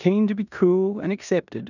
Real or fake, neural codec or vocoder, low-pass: fake; codec, 16 kHz in and 24 kHz out, 0.9 kbps, LongCat-Audio-Codec, four codebook decoder; 7.2 kHz